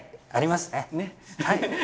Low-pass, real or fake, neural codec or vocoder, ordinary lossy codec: none; real; none; none